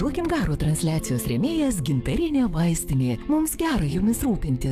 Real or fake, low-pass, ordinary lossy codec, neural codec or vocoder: fake; 14.4 kHz; AAC, 96 kbps; codec, 44.1 kHz, 7.8 kbps, DAC